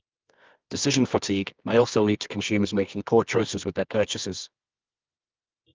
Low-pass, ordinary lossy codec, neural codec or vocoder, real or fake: 7.2 kHz; Opus, 32 kbps; codec, 24 kHz, 0.9 kbps, WavTokenizer, medium music audio release; fake